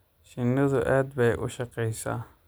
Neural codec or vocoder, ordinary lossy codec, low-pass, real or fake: none; none; none; real